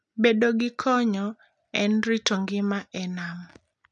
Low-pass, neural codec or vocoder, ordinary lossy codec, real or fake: 10.8 kHz; none; none; real